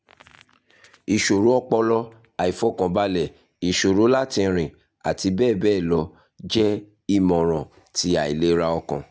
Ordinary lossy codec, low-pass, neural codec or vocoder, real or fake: none; none; none; real